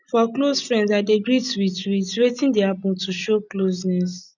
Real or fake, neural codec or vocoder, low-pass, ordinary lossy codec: real; none; 7.2 kHz; none